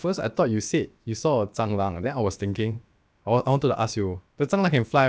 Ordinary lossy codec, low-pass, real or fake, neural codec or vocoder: none; none; fake; codec, 16 kHz, about 1 kbps, DyCAST, with the encoder's durations